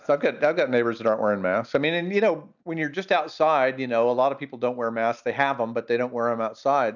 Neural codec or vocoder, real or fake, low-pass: none; real; 7.2 kHz